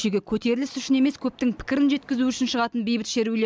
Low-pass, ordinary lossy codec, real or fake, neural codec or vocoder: none; none; real; none